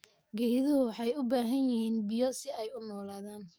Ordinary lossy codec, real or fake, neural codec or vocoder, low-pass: none; fake; codec, 44.1 kHz, 7.8 kbps, DAC; none